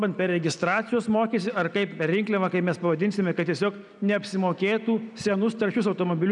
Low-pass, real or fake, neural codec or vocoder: 10.8 kHz; real; none